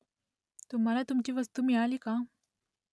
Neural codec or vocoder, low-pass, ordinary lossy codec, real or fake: none; none; none; real